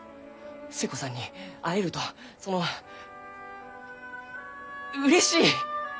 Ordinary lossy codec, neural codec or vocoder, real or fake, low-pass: none; none; real; none